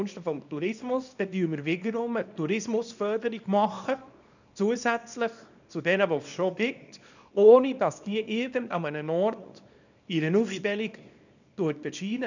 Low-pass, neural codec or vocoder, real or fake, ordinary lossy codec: 7.2 kHz; codec, 24 kHz, 0.9 kbps, WavTokenizer, small release; fake; none